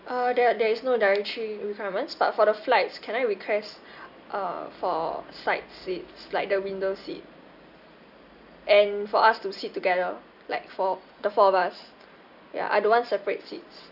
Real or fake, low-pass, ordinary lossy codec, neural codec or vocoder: real; 5.4 kHz; none; none